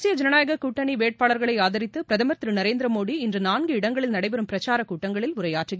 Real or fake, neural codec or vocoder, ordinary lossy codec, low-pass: real; none; none; none